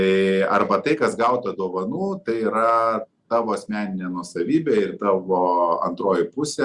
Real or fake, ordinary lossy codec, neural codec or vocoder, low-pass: real; Opus, 64 kbps; none; 10.8 kHz